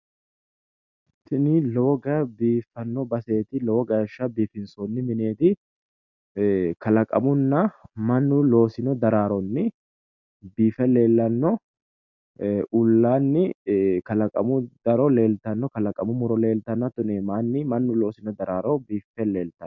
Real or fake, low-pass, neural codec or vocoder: real; 7.2 kHz; none